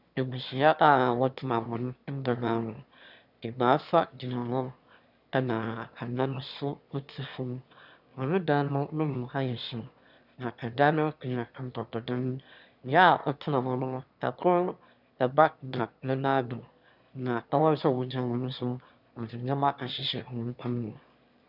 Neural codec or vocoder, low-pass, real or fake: autoencoder, 22.05 kHz, a latent of 192 numbers a frame, VITS, trained on one speaker; 5.4 kHz; fake